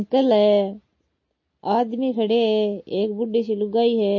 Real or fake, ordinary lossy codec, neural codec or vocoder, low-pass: real; MP3, 32 kbps; none; 7.2 kHz